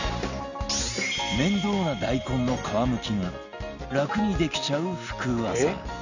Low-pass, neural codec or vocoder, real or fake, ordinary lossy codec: 7.2 kHz; none; real; none